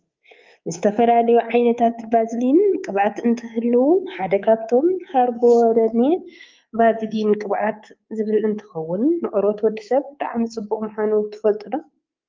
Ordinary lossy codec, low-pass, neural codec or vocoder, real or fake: Opus, 24 kbps; 7.2 kHz; codec, 24 kHz, 3.1 kbps, DualCodec; fake